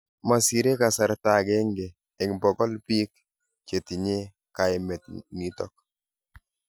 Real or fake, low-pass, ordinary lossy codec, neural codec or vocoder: real; none; none; none